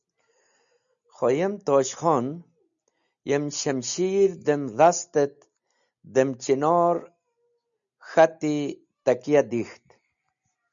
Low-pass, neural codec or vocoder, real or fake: 7.2 kHz; none; real